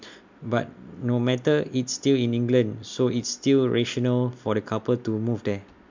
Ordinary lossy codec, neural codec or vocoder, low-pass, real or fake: MP3, 64 kbps; none; 7.2 kHz; real